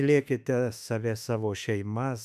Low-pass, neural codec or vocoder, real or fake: 14.4 kHz; autoencoder, 48 kHz, 32 numbers a frame, DAC-VAE, trained on Japanese speech; fake